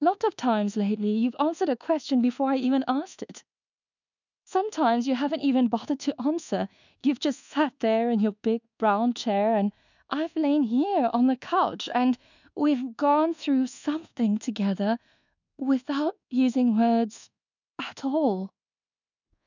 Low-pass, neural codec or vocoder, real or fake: 7.2 kHz; codec, 24 kHz, 1.2 kbps, DualCodec; fake